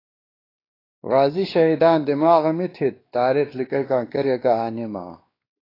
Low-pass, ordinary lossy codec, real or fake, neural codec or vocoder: 5.4 kHz; AAC, 24 kbps; fake; codec, 16 kHz, 2 kbps, X-Codec, WavLM features, trained on Multilingual LibriSpeech